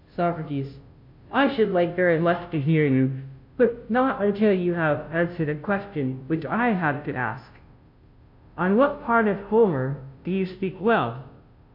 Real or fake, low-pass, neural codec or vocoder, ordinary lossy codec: fake; 5.4 kHz; codec, 16 kHz, 0.5 kbps, FunCodec, trained on Chinese and English, 25 frames a second; MP3, 48 kbps